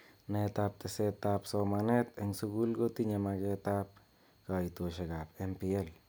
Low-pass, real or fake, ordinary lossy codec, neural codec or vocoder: none; real; none; none